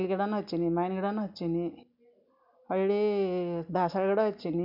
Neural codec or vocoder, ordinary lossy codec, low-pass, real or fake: none; none; 5.4 kHz; real